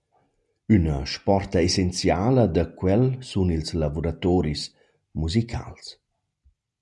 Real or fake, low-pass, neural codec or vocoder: real; 10.8 kHz; none